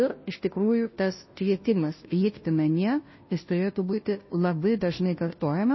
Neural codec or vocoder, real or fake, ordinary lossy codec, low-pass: codec, 16 kHz, 0.5 kbps, FunCodec, trained on Chinese and English, 25 frames a second; fake; MP3, 24 kbps; 7.2 kHz